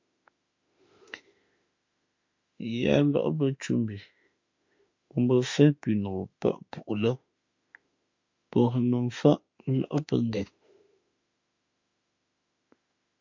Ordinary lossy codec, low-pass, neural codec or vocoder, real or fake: MP3, 48 kbps; 7.2 kHz; autoencoder, 48 kHz, 32 numbers a frame, DAC-VAE, trained on Japanese speech; fake